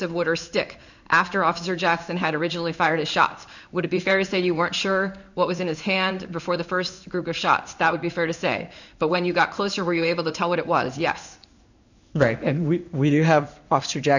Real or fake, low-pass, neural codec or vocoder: fake; 7.2 kHz; codec, 16 kHz in and 24 kHz out, 1 kbps, XY-Tokenizer